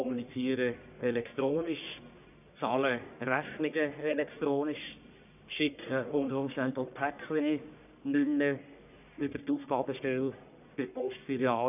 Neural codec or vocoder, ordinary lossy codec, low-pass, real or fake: codec, 44.1 kHz, 1.7 kbps, Pupu-Codec; none; 3.6 kHz; fake